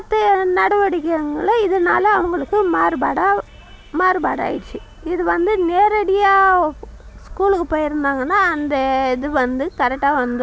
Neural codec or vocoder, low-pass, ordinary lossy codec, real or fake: none; none; none; real